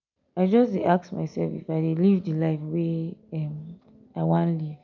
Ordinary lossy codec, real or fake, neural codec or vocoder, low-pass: none; real; none; 7.2 kHz